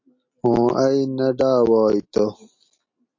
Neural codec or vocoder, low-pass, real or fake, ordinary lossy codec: none; 7.2 kHz; real; MP3, 48 kbps